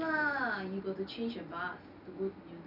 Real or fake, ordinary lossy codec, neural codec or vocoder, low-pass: real; none; none; 5.4 kHz